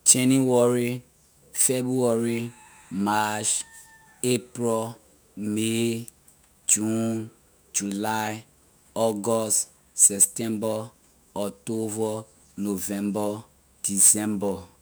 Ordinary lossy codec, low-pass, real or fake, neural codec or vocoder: none; none; fake; autoencoder, 48 kHz, 128 numbers a frame, DAC-VAE, trained on Japanese speech